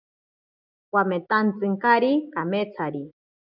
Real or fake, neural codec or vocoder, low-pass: real; none; 5.4 kHz